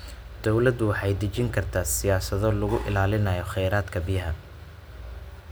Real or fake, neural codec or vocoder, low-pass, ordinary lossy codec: real; none; none; none